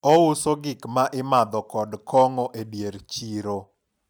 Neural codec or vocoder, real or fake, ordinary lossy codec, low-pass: none; real; none; none